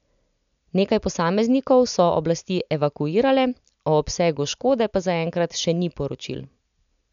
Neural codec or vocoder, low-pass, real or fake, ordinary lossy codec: none; 7.2 kHz; real; none